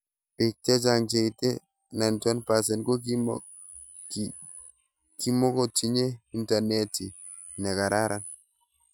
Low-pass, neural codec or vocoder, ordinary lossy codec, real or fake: none; none; none; real